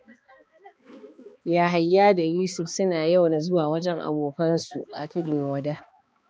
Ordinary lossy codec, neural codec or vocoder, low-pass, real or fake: none; codec, 16 kHz, 2 kbps, X-Codec, HuBERT features, trained on balanced general audio; none; fake